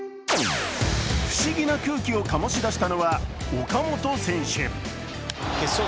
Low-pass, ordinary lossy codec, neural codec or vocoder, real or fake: none; none; none; real